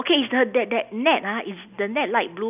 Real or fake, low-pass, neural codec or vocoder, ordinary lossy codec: real; 3.6 kHz; none; none